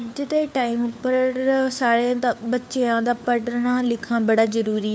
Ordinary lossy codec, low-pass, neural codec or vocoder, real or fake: none; none; codec, 16 kHz, 4 kbps, FunCodec, trained on LibriTTS, 50 frames a second; fake